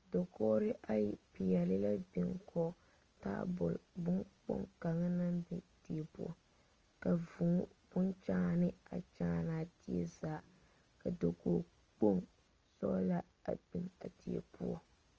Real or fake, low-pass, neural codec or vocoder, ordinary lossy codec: real; 7.2 kHz; none; Opus, 24 kbps